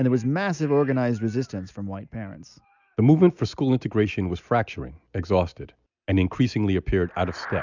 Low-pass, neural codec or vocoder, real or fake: 7.2 kHz; none; real